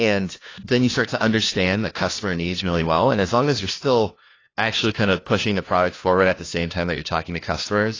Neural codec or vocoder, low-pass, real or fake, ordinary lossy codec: codec, 16 kHz, 1 kbps, FunCodec, trained on LibriTTS, 50 frames a second; 7.2 kHz; fake; AAC, 32 kbps